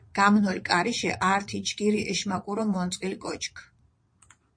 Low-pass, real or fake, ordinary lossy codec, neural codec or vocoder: 9.9 kHz; fake; MP3, 48 kbps; vocoder, 22.05 kHz, 80 mel bands, Vocos